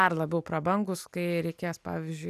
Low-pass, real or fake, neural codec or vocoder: 14.4 kHz; real; none